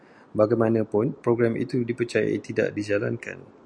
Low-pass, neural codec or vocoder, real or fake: 9.9 kHz; none; real